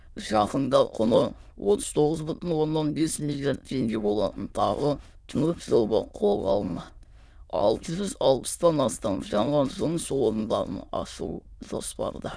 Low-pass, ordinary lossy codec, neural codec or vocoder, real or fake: none; none; autoencoder, 22.05 kHz, a latent of 192 numbers a frame, VITS, trained on many speakers; fake